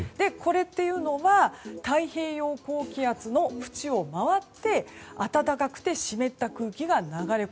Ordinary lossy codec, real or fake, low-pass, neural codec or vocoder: none; real; none; none